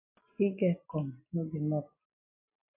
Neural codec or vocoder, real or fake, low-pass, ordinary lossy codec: none; real; 3.6 kHz; AAC, 24 kbps